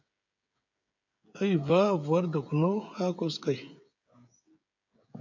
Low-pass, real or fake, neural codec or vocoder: 7.2 kHz; fake; codec, 16 kHz, 16 kbps, FreqCodec, smaller model